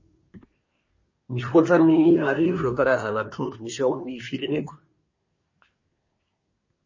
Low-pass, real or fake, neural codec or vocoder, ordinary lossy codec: 7.2 kHz; fake; codec, 24 kHz, 1 kbps, SNAC; MP3, 32 kbps